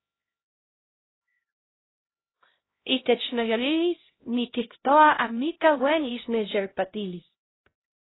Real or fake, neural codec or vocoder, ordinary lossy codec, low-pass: fake; codec, 16 kHz, 0.5 kbps, X-Codec, HuBERT features, trained on LibriSpeech; AAC, 16 kbps; 7.2 kHz